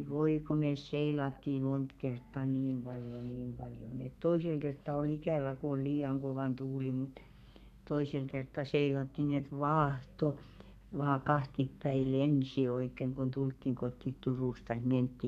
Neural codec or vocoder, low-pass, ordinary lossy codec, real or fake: codec, 32 kHz, 1.9 kbps, SNAC; 14.4 kHz; none; fake